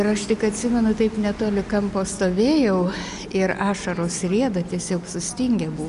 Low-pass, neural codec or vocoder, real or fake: 10.8 kHz; none; real